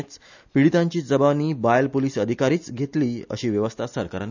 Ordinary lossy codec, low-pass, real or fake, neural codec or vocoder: none; 7.2 kHz; real; none